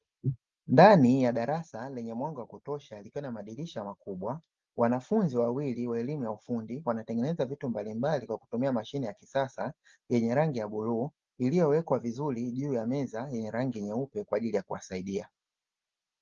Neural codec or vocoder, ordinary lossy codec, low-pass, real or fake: none; Opus, 32 kbps; 7.2 kHz; real